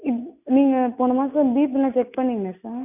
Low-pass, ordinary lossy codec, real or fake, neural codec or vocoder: 3.6 kHz; none; real; none